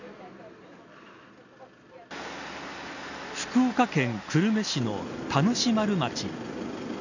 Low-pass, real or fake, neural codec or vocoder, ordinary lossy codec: 7.2 kHz; real; none; none